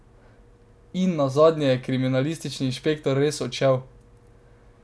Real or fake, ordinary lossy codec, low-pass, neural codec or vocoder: real; none; none; none